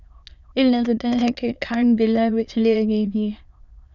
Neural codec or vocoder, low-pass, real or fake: autoencoder, 22.05 kHz, a latent of 192 numbers a frame, VITS, trained on many speakers; 7.2 kHz; fake